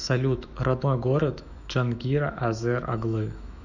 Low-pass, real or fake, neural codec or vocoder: 7.2 kHz; fake; autoencoder, 48 kHz, 128 numbers a frame, DAC-VAE, trained on Japanese speech